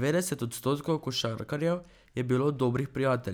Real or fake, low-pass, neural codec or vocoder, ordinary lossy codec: real; none; none; none